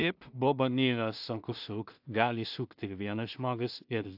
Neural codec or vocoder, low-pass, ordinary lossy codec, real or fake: codec, 16 kHz in and 24 kHz out, 0.4 kbps, LongCat-Audio-Codec, two codebook decoder; 5.4 kHz; AAC, 48 kbps; fake